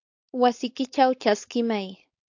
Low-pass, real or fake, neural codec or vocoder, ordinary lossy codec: 7.2 kHz; fake; codec, 16 kHz, 4.8 kbps, FACodec; AAC, 48 kbps